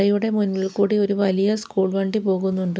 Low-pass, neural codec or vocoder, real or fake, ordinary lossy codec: none; none; real; none